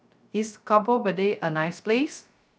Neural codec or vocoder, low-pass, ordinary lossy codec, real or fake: codec, 16 kHz, 0.3 kbps, FocalCodec; none; none; fake